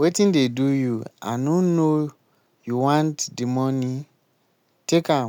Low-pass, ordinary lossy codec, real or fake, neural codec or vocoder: 19.8 kHz; none; real; none